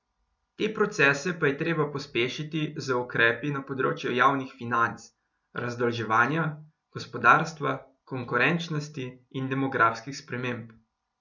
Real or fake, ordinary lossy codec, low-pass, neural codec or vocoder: real; none; 7.2 kHz; none